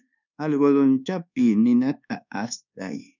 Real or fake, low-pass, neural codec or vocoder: fake; 7.2 kHz; codec, 24 kHz, 1.2 kbps, DualCodec